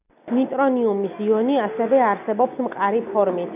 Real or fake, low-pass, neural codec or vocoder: real; 3.6 kHz; none